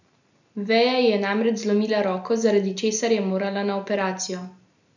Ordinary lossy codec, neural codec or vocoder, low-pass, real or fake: none; none; 7.2 kHz; real